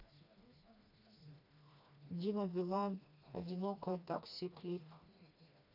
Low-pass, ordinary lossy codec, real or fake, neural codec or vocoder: 5.4 kHz; none; fake; codec, 16 kHz, 2 kbps, FreqCodec, smaller model